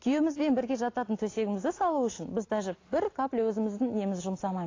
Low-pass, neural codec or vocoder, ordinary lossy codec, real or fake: 7.2 kHz; none; AAC, 32 kbps; real